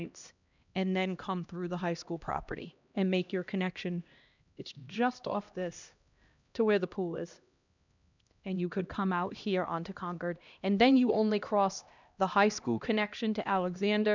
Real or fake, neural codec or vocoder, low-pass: fake; codec, 16 kHz, 1 kbps, X-Codec, HuBERT features, trained on LibriSpeech; 7.2 kHz